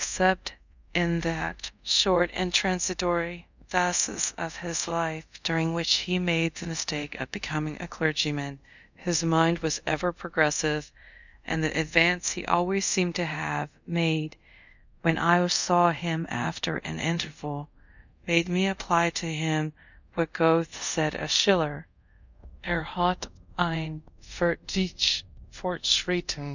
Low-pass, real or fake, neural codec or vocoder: 7.2 kHz; fake; codec, 24 kHz, 0.5 kbps, DualCodec